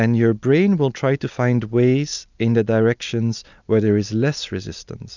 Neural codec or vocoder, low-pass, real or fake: none; 7.2 kHz; real